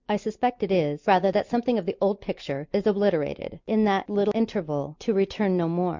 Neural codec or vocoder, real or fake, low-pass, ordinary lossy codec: none; real; 7.2 kHz; MP3, 64 kbps